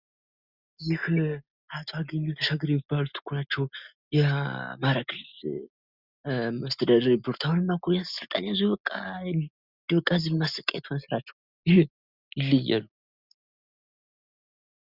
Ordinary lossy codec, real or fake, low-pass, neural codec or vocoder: Opus, 64 kbps; real; 5.4 kHz; none